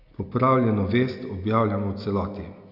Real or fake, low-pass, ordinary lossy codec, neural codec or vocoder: real; 5.4 kHz; none; none